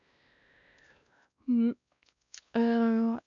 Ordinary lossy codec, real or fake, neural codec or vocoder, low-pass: none; fake; codec, 16 kHz, 1 kbps, X-Codec, WavLM features, trained on Multilingual LibriSpeech; 7.2 kHz